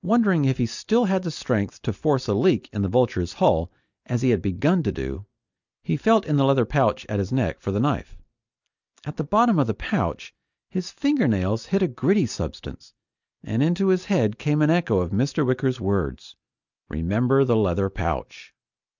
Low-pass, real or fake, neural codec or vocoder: 7.2 kHz; real; none